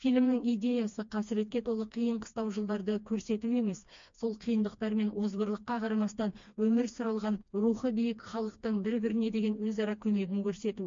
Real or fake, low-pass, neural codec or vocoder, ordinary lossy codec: fake; 7.2 kHz; codec, 16 kHz, 2 kbps, FreqCodec, smaller model; MP3, 48 kbps